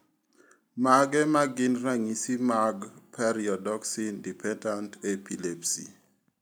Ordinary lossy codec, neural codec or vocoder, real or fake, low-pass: none; none; real; none